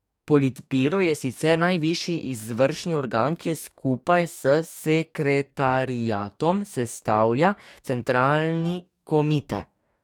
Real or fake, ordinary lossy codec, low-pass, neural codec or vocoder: fake; none; 19.8 kHz; codec, 44.1 kHz, 2.6 kbps, DAC